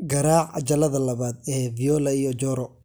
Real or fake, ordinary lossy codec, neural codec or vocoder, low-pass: real; none; none; none